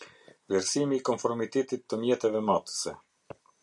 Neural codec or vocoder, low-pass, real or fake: none; 10.8 kHz; real